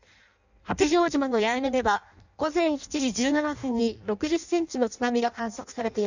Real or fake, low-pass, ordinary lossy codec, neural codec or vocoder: fake; 7.2 kHz; none; codec, 16 kHz in and 24 kHz out, 0.6 kbps, FireRedTTS-2 codec